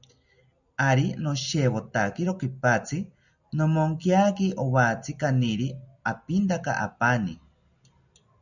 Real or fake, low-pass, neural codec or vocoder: real; 7.2 kHz; none